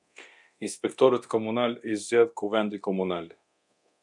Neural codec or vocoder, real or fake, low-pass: codec, 24 kHz, 0.9 kbps, DualCodec; fake; 10.8 kHz